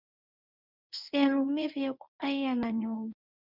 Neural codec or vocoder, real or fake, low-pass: codec, 24 kHz, 0.9 kbps, WavTokenizer, medium speech release version 1; fake; 5.4 kHz